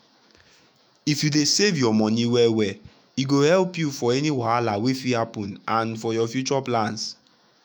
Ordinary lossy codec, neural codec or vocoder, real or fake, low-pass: none; autoencoder, 48 kHz, 128 numbers a frame, DAC-VAE, trained on Japanese speech; fake; none